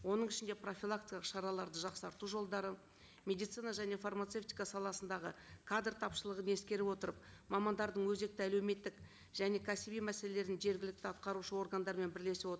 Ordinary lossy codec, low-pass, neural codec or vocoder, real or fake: none; none; none; real